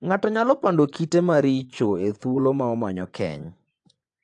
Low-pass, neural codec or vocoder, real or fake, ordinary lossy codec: 10.8 kHz; vocoder, 24 kHz, 100 mel bands, Vocos; fake; AAC, 64 kbps